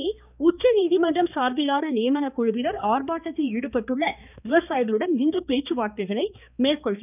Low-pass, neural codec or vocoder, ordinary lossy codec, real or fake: 3.6 kHz; codec, 16 kHz, 2 kbps, X-Codec, HuBERT features, trained on balanced general audio; none; fake